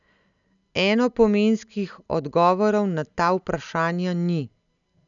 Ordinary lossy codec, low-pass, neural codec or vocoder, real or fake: none; 7.2 kHz; none; real